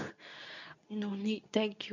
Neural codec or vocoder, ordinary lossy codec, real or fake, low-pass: codec, 24 kHz, 0.9 kbps, WavTokenizer, medium speech release version 2; none; fake; 7.2 kHz